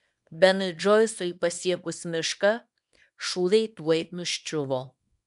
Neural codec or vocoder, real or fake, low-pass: codec, 24 kHz, 0.9 kbps, WavTokenizer, small release; fake; 10.8 kHz